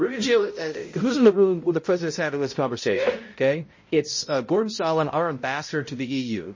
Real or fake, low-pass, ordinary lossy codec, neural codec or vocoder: fake; 7.2 kHz; MP3, 32 kbps; codec, 16 kHz, 0.5 kbps, X-Codec, HuBERT features, trained on balanced general audio